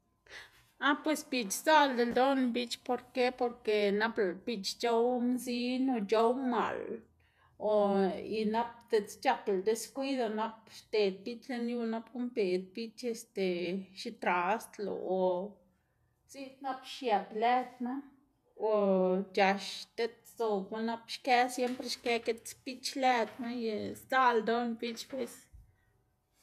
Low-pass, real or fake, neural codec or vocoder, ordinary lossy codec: 14.4 kHz; fake; vocoder, 48 kHz, 128 mel bands, Vocos; none